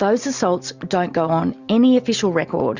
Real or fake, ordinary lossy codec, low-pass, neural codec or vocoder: real; Opus, 64 kbps; 7.2 kHz; none